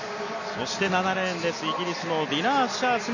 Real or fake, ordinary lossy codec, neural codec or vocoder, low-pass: real; none; none; 7.2 kHz